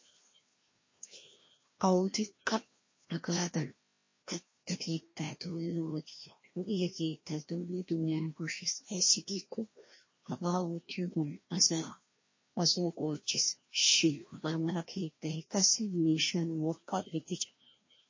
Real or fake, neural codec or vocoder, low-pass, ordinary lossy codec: fake; codec, 16 kHz, 1 kbps, FreqCodec, larger model; 7.2 kHz; MP3, 32 kbps